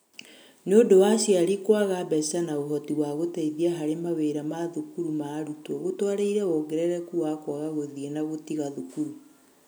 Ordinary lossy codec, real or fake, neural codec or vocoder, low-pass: none; real; none; none